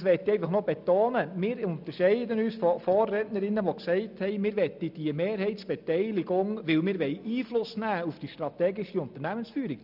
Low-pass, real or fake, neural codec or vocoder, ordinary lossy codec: 5.4 kHz; real; none; none